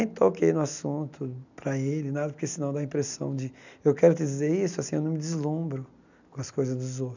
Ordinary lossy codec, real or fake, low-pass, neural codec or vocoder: none; real; 7.2 kHz; none